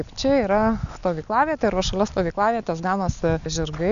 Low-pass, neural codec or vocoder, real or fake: 7.2 kHz; none; real